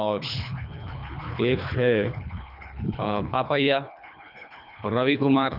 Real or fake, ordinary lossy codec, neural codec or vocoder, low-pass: fake; none; codec, 24 kHz, 3 kbps, HILCodec; 5.4 kHz